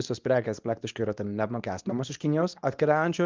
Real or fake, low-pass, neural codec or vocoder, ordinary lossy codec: fake; 7.2 kHz; codec, 24 kHz, 0.9 kbps, WavTokenizer, medium speech release version 2; Opus, 24 kbps